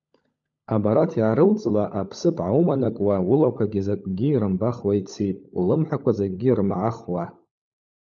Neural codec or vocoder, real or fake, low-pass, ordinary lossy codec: codec, 16 kHz, 16 kbps, FunCodec, trained on LibriTTS, 50 frames a second; fake; 7.2 kHz; MP3, 64 kbps